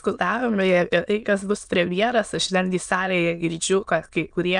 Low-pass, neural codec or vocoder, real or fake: 9.9 kHz; autoencoder, 22.05 kHz, a latent of 192 numbers a frame, VITS, trained on many speakers; fake